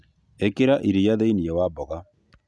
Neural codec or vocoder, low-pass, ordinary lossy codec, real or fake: none; none; none; real